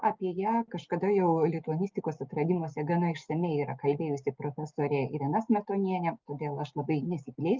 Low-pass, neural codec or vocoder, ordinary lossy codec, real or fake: 7.2 kHz; none; Opus, 32 kbps; real